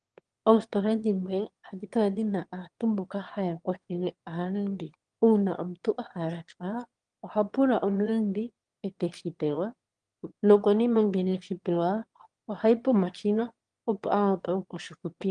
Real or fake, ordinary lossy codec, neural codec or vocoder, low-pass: fake; Opus, 24 kbps; autoencoder, 22.05 kHz, a latent of 192 numbers a frame, VITS, trained on one speaker; 9.9 kHz